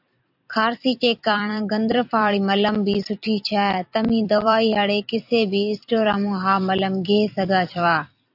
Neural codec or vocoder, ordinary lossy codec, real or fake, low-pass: vocoder, 44.1 kHz, 128 mel bands every 256 samples, BigVGAN v2; AAC, 48 kbps; fake; 5.4 kHz